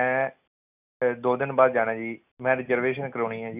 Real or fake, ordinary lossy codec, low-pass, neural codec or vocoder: real; none; 3.6 kHz; none